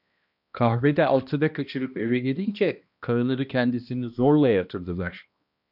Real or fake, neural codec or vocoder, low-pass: fake; codec, 16 kHz, 1 kbps, X-Codec, HuBERT features, trained on balanced general audio; 5.4 kHz